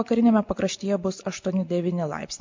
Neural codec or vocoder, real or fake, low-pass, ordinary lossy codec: none; real; 7.2 kHz; MP3, 48 kbps